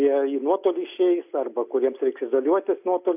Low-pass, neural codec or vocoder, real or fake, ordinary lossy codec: 3.6 kHz; none; real; AAC, 32 kbps